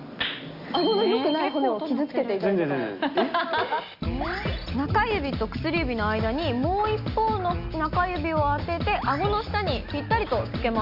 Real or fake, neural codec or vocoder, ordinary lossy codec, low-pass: real; none; Opus, 64 kbps; 5.4 kHz